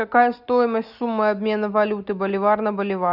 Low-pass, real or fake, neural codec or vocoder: 5.4 kHz; real; none